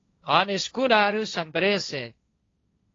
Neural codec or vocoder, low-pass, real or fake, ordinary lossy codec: codec, 16 kHz, 1.1 kbps, Voila-Tokenizer; 7.2 kHz; fake; AAC, 32 kbps